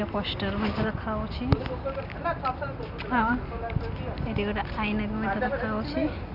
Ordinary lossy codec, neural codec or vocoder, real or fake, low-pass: none; none; real; 5.4 kHz